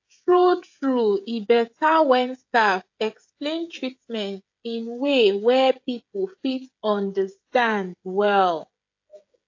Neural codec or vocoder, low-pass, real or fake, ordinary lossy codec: codec, 16 kHz, 16 kbps, FreqCodec, smaller model; 7.2 kHz; fake; AAC, 48 kbps